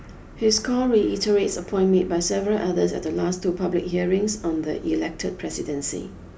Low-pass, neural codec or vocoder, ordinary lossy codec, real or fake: none; none; none; real